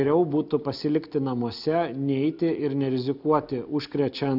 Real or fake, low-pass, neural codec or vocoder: real; 5.4 kHz; none